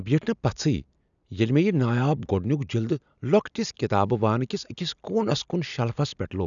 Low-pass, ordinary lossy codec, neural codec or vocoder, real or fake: 7.2 kHz; none; none; real